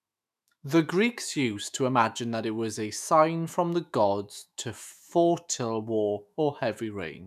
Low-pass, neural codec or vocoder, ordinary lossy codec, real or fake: 14.4 kHz; autoencoder, 48 kHz, 128 numbers a frame, DAC-VAE, trained on Japanese speech; none; fake